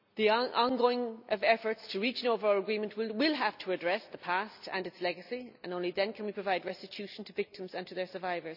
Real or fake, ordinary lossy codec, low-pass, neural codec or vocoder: real; none; 5.4 kHz; none